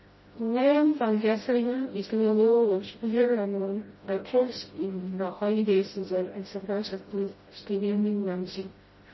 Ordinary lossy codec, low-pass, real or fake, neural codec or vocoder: MP3, 24 kbps; 7.2 kHz; fake; codec, 16 kHz, 0.5 kbps, FreqCodec, smaller model